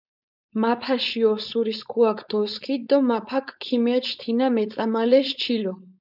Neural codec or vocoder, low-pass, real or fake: codec, 16 kHz, 4.8 kbps, FACodec; 5.4 kHz; fake